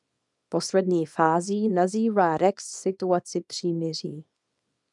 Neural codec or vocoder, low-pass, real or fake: codec, 24 kHz, 0.9 kbps, WavTokenizer, small release; 10.8 kHz; fake